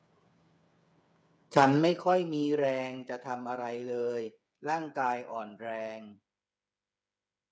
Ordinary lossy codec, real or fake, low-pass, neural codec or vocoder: none; fake; none; codec, 16 kHz, 8 kbps, FreqCodec, smaller model